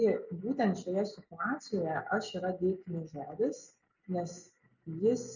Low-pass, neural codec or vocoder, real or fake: 7.2 kHz; none; real